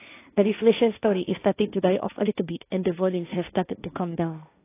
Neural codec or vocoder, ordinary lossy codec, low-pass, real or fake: codec, 16 kHz, 1.1 kbps, Voila-Tokenizer; AAC, 24 kbps; 3.6 kHz; fake